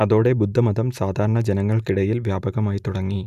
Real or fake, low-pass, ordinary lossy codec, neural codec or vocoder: real; 14.4 kHz; none; none